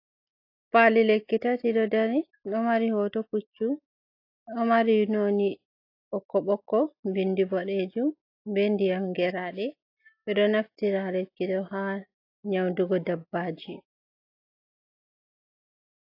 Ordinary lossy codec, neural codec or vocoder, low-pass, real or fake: AAC, 32 kbps; none; 5.4 kHz; real